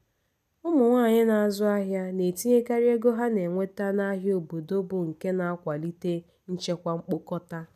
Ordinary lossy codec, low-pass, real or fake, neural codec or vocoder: none; 14.4 kHz; real; none